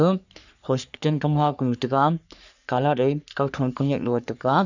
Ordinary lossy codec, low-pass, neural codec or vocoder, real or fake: none; 7.2 kHz; codec, 44.1 kHz, 3.4 kbps, Pupu-Codec; fake